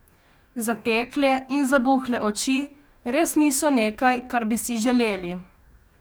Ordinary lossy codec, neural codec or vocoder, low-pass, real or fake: none; codec, 44.1 kHz, 2.6 kbps, DAC; none; fake